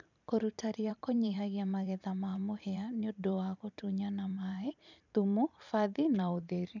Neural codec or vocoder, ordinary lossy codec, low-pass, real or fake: vocoder, 44.1 kHz, 128 mel bands every 512 samples, BigVGAN v2; none; 7.2 kHz; fake